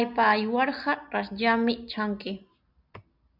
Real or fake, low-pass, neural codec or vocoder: real; 5.4 kHz; none